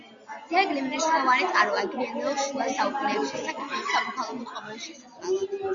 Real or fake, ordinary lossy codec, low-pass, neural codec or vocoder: real; Opus, 64 kbps; 7.2 kHz; none